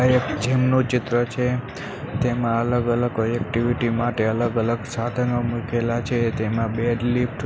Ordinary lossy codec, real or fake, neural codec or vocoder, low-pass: none; real; none; none